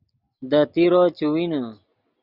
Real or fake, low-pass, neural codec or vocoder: real; 5.4 kHz; none